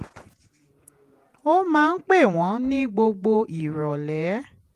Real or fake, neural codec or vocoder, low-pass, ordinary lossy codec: fake; vocoder, 48 kHz, 128 mel bands, Vocos; 14.4 kHz; Opus, 32 kbps